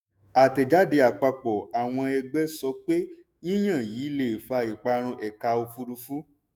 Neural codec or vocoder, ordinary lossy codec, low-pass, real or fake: autoencoder, 48 kHz, 128 numbers a frame, DAC-VAE, trained on Japanese speech; none; none; fake